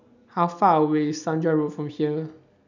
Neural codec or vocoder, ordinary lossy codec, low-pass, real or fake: none; none; 7.2 kHz; real